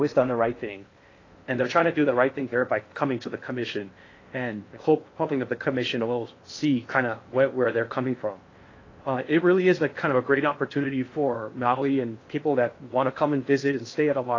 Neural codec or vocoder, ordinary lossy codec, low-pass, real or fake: codec, 16 kHz in and 24 kHz out, 0.6 kbps, FocalCodec, streaming, 4096 codes; AAC, 32 kbps; 7.2 kHz; fake